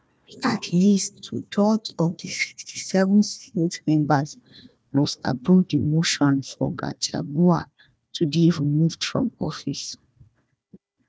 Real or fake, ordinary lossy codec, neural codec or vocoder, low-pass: fake; none; codec, 16 kHz, 1 kbps, FunCodec, trained on Chinese and English, 50 frames a second; none